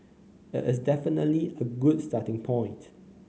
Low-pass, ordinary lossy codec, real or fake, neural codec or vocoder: none; none; real; none